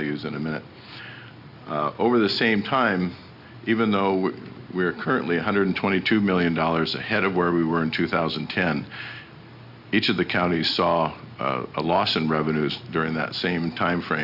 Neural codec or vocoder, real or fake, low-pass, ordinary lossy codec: none; real; 5.4 kHz; Opus, 64 kbps